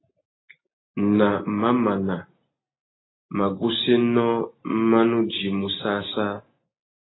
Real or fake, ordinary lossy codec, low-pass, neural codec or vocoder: real; AAC, 16 kbps; 7.2 kHz; none